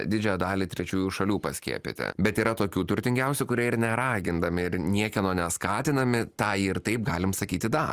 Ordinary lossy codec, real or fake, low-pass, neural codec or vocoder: Opus, 32 kbps; real; 14.4 kHz; none